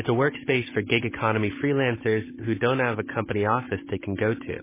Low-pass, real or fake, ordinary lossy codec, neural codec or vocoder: 3.6 kHz; fake; MP3, 16 kbps; codec, 16 kHz, 16 kbps, FunCodec, trained on Chinese and English, 50 frames a second